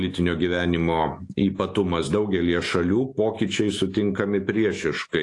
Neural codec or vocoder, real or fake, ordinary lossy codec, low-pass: autoencoder, 48 kHz, 128 numbers a frame, DAC-VAE, trained on Japanese speech; fake; AAC, 48 kbps; 10.8 kHz